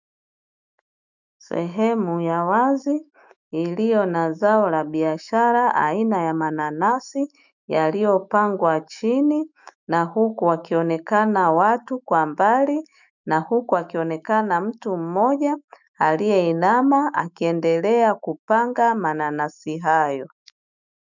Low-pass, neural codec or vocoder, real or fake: 7.2 kHz; autoencoder, 48 kHz, 128 numbers a frame, DAC-VAE, trained on Japanese speech; fake